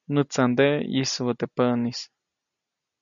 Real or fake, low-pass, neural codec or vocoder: real; 7.2 kHz; none